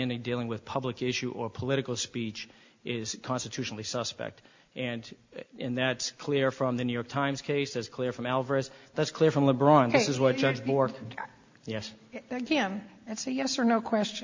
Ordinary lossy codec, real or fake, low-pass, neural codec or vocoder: MP3, 48 kbps; real; 7.2 kHz; none